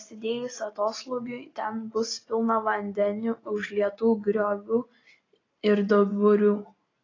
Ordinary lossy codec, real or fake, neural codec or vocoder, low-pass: AAC, 32 kbps; real; none; 7.2 kHz